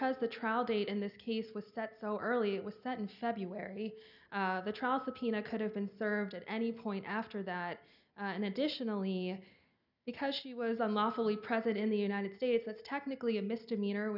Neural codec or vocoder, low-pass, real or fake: none; 5.4 kHz; real